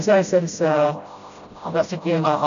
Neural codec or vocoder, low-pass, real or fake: codec, 16 kHz, 0.5 kbps, FreqCodec, smaller model; 7.2 kHz; fake